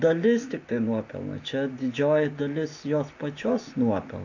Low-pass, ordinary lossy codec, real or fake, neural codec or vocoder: 7.2 kHz; AAC, 48 kbps; real; none